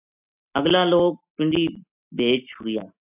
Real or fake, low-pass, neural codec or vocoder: real; 3.6 kHz; none